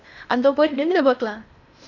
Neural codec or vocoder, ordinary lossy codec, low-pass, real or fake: codec, 16 kHz in and 24 kHz out, 0.8 kbps, FocalCodec, streaming, 65536 codes; none; 7.2 kHz; fake